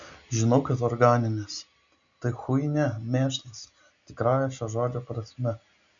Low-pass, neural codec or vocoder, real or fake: 7.2 kHz; none; real